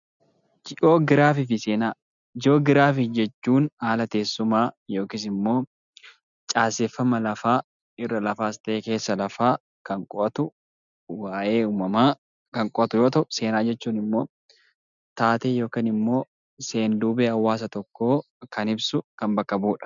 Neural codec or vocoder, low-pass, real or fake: none; 7.2 kHz; real